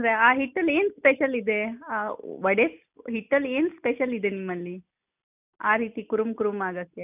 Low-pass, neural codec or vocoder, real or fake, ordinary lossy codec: 3.6 kHz; none; real; none